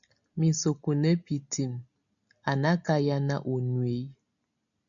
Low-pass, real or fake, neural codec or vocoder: 7.2 kHz; real; none